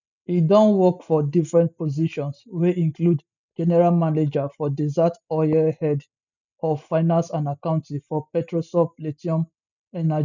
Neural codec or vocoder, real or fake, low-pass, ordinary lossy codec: none; real; 7.2 kHz; none